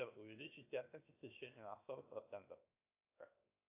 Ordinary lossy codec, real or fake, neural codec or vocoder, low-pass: AAC, 32 kbps; fake; codec, 16 kHz, 0.7 kbps, FocalCodec; 3.6 kHz